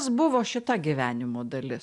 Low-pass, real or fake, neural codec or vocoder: 10.8 kHz; real; none